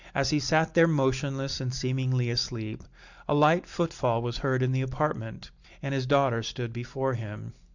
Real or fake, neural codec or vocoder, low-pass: real; none; 7.2 kHz